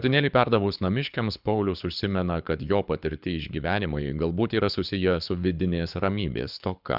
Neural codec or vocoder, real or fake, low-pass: codec, 24 kHz, 6 kbps, HILCodec; fake; 5.4 kHz